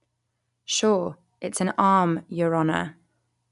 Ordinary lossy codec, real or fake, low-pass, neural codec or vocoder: none; real; 10.8 kHz; none